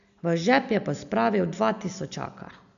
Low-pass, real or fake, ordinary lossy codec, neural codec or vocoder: 7.2 kHz; real; none; none